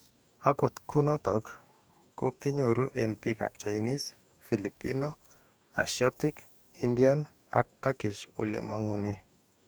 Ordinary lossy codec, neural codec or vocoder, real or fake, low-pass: none; codec, 44.1 kHz, 2.6 kbps, DAC; fake; none